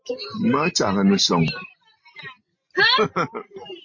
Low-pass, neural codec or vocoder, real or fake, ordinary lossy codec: 7.2 kHz; none; real; MP3, 32 kbps